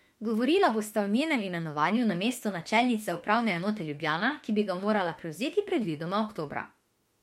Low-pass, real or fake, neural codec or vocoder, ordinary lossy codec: 19.8 kHz; fake; autoencoder, 48 kHz, 32 numbers a frame, DAC-VAE, trained on Japanese speech; MP3, 64 kbps